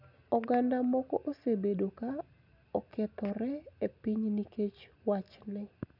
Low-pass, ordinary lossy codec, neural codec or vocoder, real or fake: 5.4 kHz; none; none; real